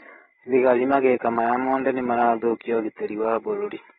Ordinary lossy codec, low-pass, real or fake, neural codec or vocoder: AAC, 16 kbps; 7.2 kHz; fake; codec, 16 kHz, 8 kbps, FreqCodec, larger model